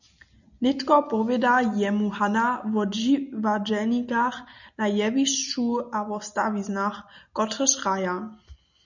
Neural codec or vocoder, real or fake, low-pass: none; real; 7.2 kHz